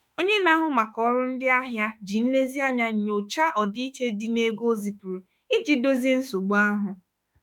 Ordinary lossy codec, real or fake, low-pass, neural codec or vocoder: none; fake; 19.8 kHz; autoencoder, 48 kHz, 32 numbers a frame, DAC-VAE, trained on Japanese speech